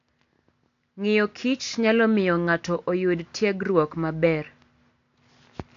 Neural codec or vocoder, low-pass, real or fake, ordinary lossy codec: none; 7.2 kHz; real; AAC, 48 kbps